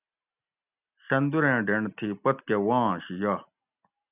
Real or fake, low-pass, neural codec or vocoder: real; 3.6 kHz; none